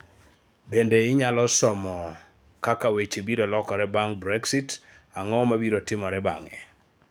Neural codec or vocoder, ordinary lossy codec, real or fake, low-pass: codec, 44.1 kHz, 7.8 kbps, DAC; none; fake; none